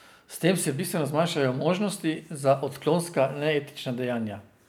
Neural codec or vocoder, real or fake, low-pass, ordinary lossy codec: none; real; none; none